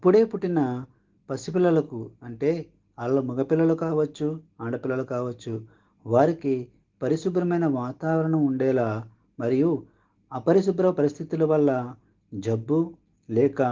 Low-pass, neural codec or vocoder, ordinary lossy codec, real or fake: 7.2 kHz; none; Opus, 16 kbps; real